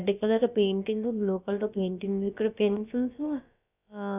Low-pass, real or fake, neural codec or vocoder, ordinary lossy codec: 3.6 kHz; fake; codec, 16 kHz, about 1 kbps, DyCAST, with the encoder's durations; none